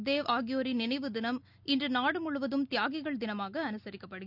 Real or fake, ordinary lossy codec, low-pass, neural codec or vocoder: real; none; 5.4 kHz; none